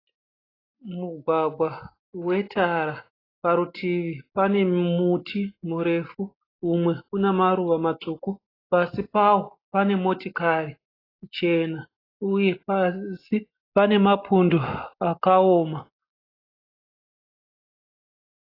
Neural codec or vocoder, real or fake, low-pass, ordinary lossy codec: none; real; 5.4 kHz; AAC, 24 kbps